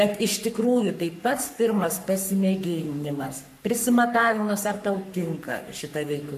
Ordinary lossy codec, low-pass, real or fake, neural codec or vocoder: MP3, 96 kbps; 14.4 kHz; fake; codec, 44.1 kHz, 3.4 kbps, Pupu-Codec